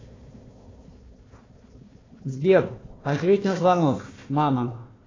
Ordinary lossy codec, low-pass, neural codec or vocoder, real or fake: AAC, 32 kbps; 7.2 kHz; codec, 16 kHz, 1 kbps, FunCodec, trained on Chinese and English, 50 frames a second; fake